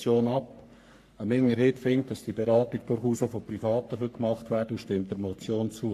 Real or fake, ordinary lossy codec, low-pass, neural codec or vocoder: fake; AAC, 64 kbps; 14.4 kHz; codec, 44.1 kHz, 3.4 kbps, Pupu-Codec